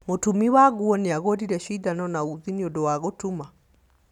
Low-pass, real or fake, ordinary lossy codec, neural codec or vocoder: 19.8 kHz; real; none; none